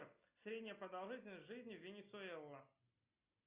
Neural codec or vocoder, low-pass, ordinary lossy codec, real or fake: none; 3.6 kHz; Opus, 64 kbps; real